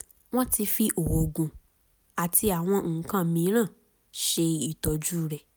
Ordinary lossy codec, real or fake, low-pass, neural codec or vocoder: none; real; none; none